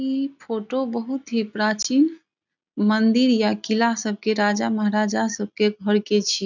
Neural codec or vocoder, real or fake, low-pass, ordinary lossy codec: none; real; 7.2 kHz; none